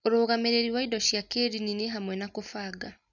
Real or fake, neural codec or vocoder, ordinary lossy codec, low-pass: real; none; none; 7.2 kHz